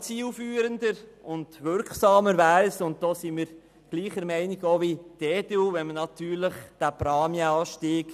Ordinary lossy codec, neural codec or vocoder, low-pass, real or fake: none; none; 14.4 kHz; real